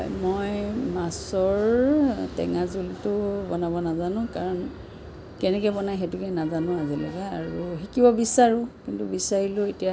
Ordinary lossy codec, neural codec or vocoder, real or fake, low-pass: none; none; real; none